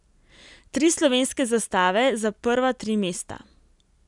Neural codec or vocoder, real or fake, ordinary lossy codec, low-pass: none; real; none; 10.8 kHz